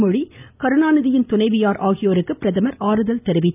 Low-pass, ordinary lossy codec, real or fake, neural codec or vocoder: 3.6 kHz; none; real; none